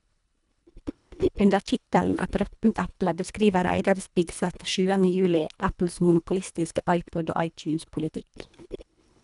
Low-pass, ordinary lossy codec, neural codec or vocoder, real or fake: 10.8 kHz; none; codec, 24 kHz, 1.5 kbps, HILCodec; fake